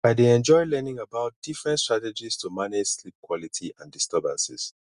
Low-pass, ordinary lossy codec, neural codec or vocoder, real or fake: 10.8 kHz; Opus, 64 kbps; none; real